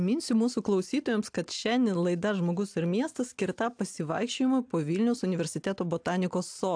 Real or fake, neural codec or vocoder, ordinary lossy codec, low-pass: real; none; AAC, 64 kbps; 9.9 kHz